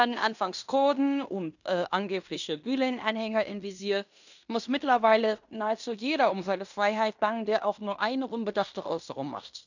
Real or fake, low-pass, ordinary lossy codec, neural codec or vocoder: fake; 7.2 kHz; none; codec, 16 kHz in and 24 kHz out, 0.9 kbps, LongCat-Audio-Codec, fine tuned four codebook decoder